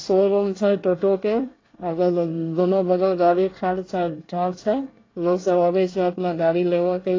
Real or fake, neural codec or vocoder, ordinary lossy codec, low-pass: fake; codec, 24 kHz, 1 kbps, SNAC; AAC, 32 kbps; 7.2 kHz